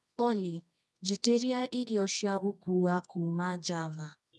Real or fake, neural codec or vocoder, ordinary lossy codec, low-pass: fake; codec, 24 kHz, 0.9 kbps, WavTokenizer, medium music audio release; none; none